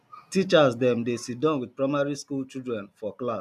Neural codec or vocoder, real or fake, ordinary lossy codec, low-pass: none; real; none; 14.4 kHz